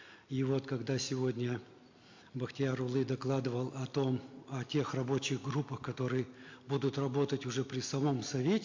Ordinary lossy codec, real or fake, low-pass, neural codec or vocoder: MP3, 48 kbps; real; 7.2 kHz; none